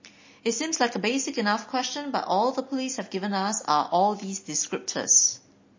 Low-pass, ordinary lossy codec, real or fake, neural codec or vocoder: 7.2 kHz; MP3, 32 kbps; real; none